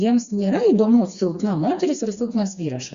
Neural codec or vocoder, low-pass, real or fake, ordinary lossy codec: codec, 16 kHz, 2 kbps, FreqCodec, smaller model; 7.2 kHz; fake; Opus, 64 kbps